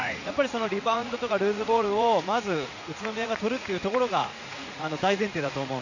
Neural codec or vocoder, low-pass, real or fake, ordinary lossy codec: vocoder, 44.1 kHz, 80 mel bands, Vocos; 7.2 kHz; fake; none